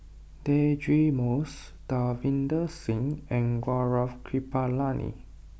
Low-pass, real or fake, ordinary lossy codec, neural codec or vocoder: none; real; none; none